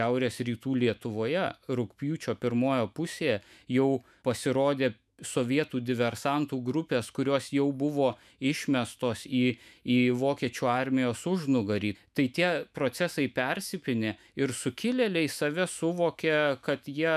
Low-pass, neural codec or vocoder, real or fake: 14.4 kHz; autoencoder, 48 kHz, 128 numbers a frame, DAC-VAE, trained on Japanese speech; fake